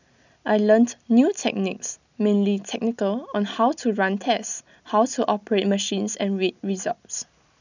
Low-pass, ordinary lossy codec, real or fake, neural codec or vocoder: 7.2 kHz; none; real; none